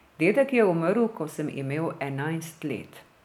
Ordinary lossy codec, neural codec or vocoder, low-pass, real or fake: none; none; 19.8 kHz; real